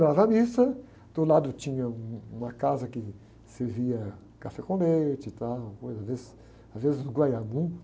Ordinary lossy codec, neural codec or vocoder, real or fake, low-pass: none; none; real; none